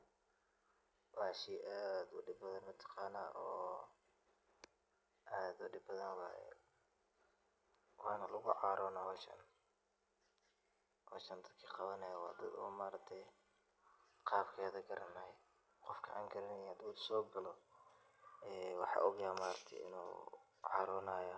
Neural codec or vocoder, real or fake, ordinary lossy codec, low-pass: none; real; none; none